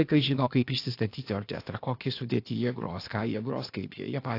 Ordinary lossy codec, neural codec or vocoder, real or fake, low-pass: AAC, 32 kbps; codec, 16 kHz, 0.8 kbps, ZipCodec; fake; 5.4 kHz